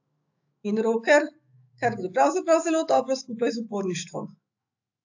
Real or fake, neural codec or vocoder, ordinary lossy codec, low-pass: fake; autoencoder, 48 kHz, 128 numbers a frame, DAC-VAE, trained on Japanese speech; AAC, 48 kbps; 7.2 kHz